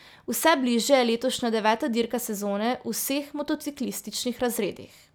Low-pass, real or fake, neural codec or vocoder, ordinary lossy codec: none; real; none; none